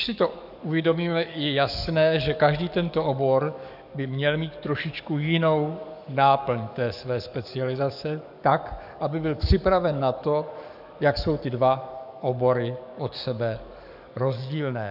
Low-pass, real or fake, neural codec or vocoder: 5.4 kHz; fake; codec, 44.1 kHz, 7.8 kbps, DAC